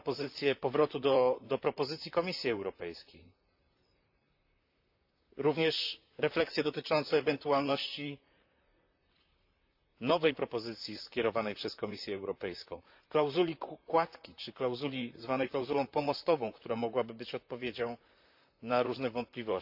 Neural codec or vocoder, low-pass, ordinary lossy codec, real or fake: vocoder, 44.1 kHz, 128 mel bands, Pupu-Vocoder; 5.4 kHz; AAC, 48 kbps; fake